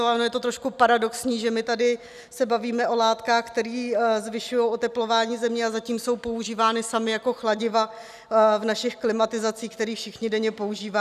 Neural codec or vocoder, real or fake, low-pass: none; real; 14.4 kHz